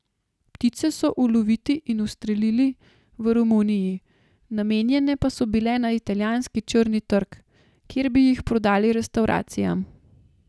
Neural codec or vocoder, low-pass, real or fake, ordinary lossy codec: none; none; real; none